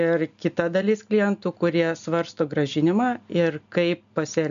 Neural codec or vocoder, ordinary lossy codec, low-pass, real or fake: none; AAC, 96 kbps; 7.2 kHz; real